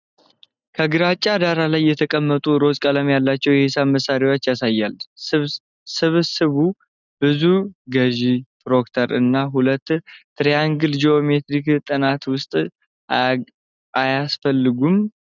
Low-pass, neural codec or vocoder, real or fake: 7.2 kHz; none; real